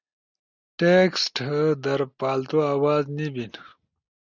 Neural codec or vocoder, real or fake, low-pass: none; real; 7.2 kHz